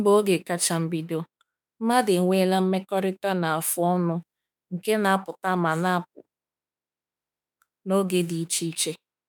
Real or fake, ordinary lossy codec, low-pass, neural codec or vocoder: fake; none; none; autoencoder, 48 kHz, 32 numbers a frame, DAC-VAE, trained on Japanese speech